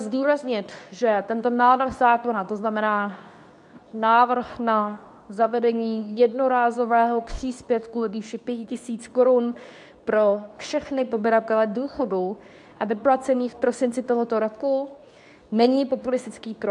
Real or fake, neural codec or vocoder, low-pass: fake; codec, 24 kHz, 0.9 kbps, WavTokenizer, medium speech release version 2; 10.8 kHz